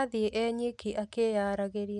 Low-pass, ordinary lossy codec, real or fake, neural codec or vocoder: 10.8 kHz; none; real; none